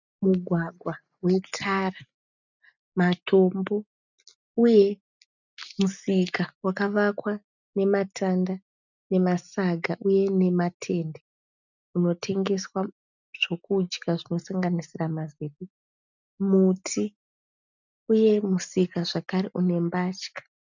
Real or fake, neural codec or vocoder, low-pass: real; none; 7.2 kHz